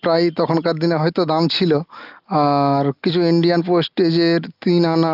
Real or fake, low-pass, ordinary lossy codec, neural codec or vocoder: real; 5.4 kHz; Opus, 32 kbps; none